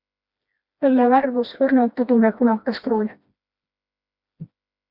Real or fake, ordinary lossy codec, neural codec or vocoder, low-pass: fake; Opus, 64 kbps; codec, 16 kHz, 1 kbps, FreqCodec, smaller model; 5.4 kHz